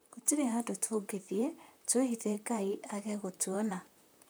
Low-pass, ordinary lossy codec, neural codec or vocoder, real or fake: none; none; vocoder, 44.1 kHz, 128 mel bands, Pupu-Vocoder; fake